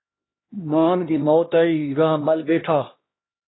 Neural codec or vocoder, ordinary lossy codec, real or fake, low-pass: codec, 16 kHz, 1 kbps, X-Codec, HuBERT features, trained on LibriSpeech; AAC, 16 kbps; fake; 7.2 kHz